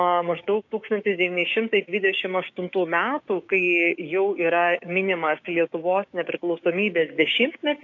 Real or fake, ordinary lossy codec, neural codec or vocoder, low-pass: fake; AAC, 48 kbps; codec, 16 kHz, 6 kbps, DAC; 7.2 kHz